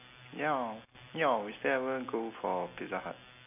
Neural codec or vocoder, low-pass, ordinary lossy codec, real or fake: none; 3.6 kHz; none; real